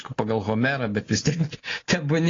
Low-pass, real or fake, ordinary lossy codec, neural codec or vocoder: 7.2 kHz; real; AAC, 32 kbps; none